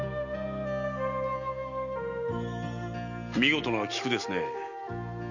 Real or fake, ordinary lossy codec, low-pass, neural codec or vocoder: real; none; 7.2 kHz; none